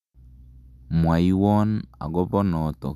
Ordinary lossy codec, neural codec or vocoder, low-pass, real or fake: none; none; 14.4 kHz; real